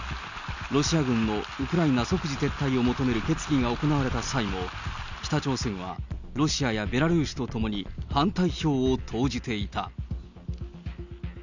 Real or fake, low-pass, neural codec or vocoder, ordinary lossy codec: real; 7.2 kHz; none; none